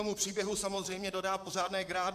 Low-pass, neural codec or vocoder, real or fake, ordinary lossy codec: 14.4 kHz; vocoder, 44.1 kHz, 128 mel bands, Pupu-Vocoder; fake; MP3, 96 kbps